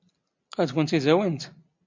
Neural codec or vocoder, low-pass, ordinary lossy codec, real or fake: none; 7.2 kHz; MP3, 64 kbps; real